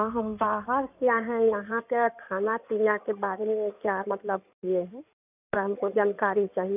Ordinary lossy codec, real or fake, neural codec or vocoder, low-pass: none; fake; codec, 16 kHz in and 24 kHz out, 2.2 kbps, FireRedTTS-2 codec; 3.6 kHz